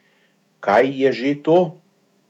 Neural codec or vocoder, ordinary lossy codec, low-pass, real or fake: autoencoder, 48 kHz, 128 numbers a frame, DAC-VAE, trained on Japanese speech; none; 19.8 kHz; fake